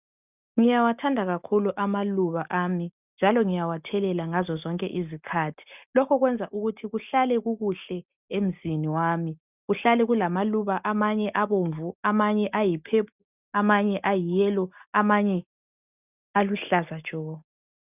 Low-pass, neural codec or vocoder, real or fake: 3.6 kHz; none; real